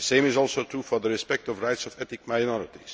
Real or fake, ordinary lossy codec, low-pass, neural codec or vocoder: real; none; none; none